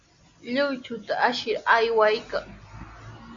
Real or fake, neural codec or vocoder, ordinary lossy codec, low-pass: real; none; Opus, 64 kbps; 7.2 kHz